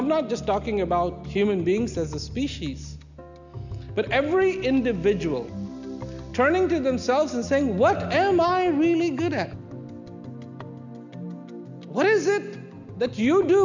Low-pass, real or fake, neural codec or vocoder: 7.2 kHz; real; none